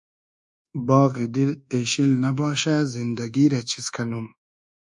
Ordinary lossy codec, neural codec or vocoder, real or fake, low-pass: MP3, 96 kbps; codec, 24 kHz, 1.2 kbps, DualCodec; fake; 10.8 kHz